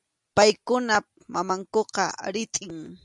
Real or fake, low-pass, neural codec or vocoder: real; 10.8 kHz; none